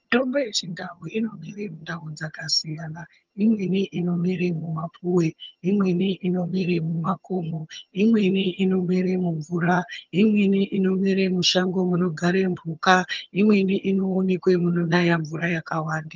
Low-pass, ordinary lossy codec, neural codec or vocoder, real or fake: 7.2 kHz; Opus, 32 kbps; vocoder, 22.05 kHz, 80 mel bands, HiFi-GAN; fake